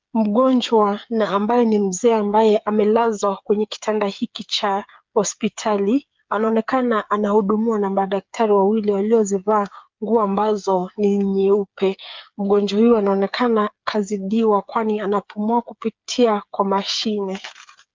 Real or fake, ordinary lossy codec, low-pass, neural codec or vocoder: fake; Opus, 24 kbps; 7.2 kHz; codec, 16 kHz, 8 kbps, FreqCodec, smaller model